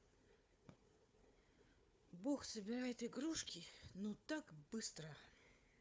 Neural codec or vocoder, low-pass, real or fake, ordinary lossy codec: codec, 16 kHz, 4 kbps, FunCodec, trained on Chinese and English, 50 frames a second; none; fake; none